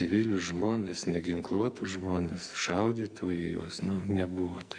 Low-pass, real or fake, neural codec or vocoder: 9.9 kHz; fake; codec, 44.1 kHz, 2.6 kbps, SNAC